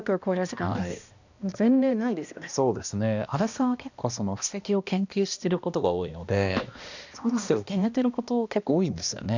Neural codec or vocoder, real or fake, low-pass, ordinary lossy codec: codec, 16 kHz, 1 kbps, X-Codec, HuBERT features, trained on balanced general audio; fake; 7.2 kHz; none